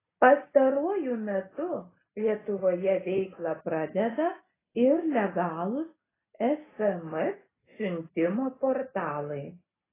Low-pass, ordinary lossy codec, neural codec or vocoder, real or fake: 3.6 kHz; AAC, 16 kbps; none; real